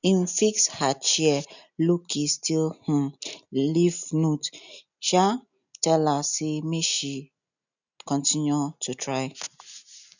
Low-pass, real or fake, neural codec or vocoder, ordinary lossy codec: 7.2 kHz; real; none; none